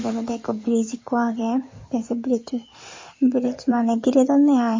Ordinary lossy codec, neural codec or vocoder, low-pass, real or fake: MP3, 32 kbps; codec, 16 kHz in and 24 kHz out, 2.2 kbps, FireRedTTS-2 codec; 7.2 kHz; fake